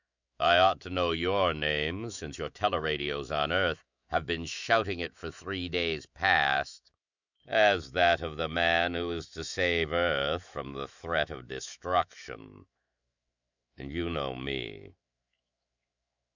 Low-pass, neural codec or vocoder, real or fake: 7.2 kHz; autoencoder, 48 kHz, 128 numbers a frame, DAC-VAE, trained on Japanese speech; fake